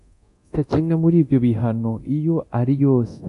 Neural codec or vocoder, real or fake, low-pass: codec, 24 kHz, 0.9 kbps, DualCodec; fake; 10.8 kHz